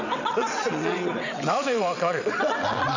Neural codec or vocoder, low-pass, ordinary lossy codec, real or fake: vocoder, 22.05 kHz, 80 mel bands, WaveNeXt; 7.2 kHz; none; fake